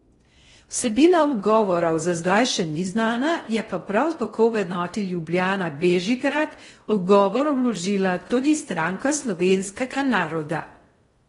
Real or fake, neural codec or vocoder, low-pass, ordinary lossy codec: fake; codec, 16 kHz in and 24 kHz out, 0.6 kbps, FocalCodec, streaming, 4096 codes; 10.8 kHz; AAC, 32 kbps